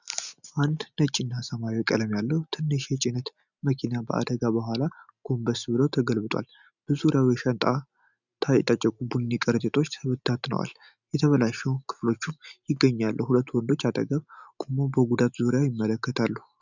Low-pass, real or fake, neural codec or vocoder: 7.2 kHz; real; none